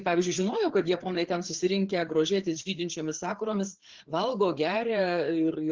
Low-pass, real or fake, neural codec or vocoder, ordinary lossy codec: 7.2 kHz; fake; codec, 16 kHz, 4 kbps, FreqCodec, larger model; Opus, 16 kbps